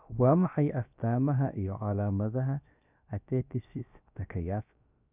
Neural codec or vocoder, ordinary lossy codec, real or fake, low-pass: codec, 16 kHz, about 1 kbps, DyCAST, with the encoder's durations; none; fake; 3.6 kHz